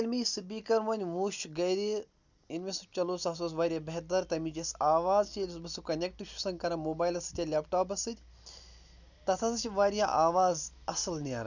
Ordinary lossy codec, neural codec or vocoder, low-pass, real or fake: none; none; 7.2 kHz; real